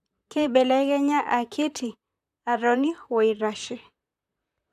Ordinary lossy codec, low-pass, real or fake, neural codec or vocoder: MP3, 96 kbps; 14.4 kHz; fake; vocoder, 44.1 kHz, 128 mel bands, Pupu-Vocoder